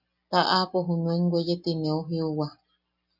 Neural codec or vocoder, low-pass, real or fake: none; 5.4 kHz; real